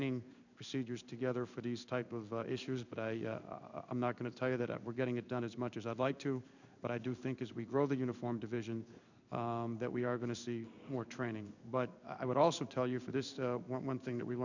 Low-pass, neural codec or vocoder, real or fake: 7.2 kHz; codec, 16 kHz in and 24 kHz out, 1 kbps, XY-Tokenizer; fake